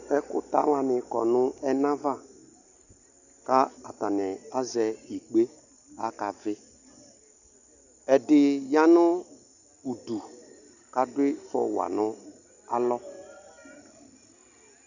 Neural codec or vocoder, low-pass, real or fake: none; 7.2 kHz; real